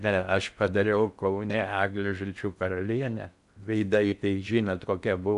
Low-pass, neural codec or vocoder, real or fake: 10.8 kHz; codec, 16 kHz in and 24 kHz out, 0.6 kbps, FocalCodec, streaming, 4096 codes; fake